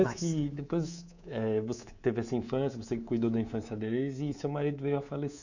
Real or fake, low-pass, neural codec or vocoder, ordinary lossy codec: fake; 7.2 kHz; codec, 24 kHz, 3.1 kbps, DualCodec; none